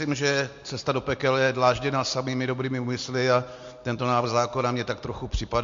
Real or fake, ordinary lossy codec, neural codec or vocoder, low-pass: real; MP3, 48 kbps; none; 7.2 kHz